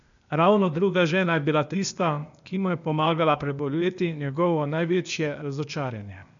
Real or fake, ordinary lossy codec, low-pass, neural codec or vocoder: fake; none; 7.2 kHz; codec, 16 kHz, 0.8 kbps, ZipCodec